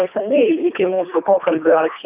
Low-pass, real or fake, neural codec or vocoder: 3.6 kHz; fake; codec, 24 kHz, 1.5 kbps, HILCodec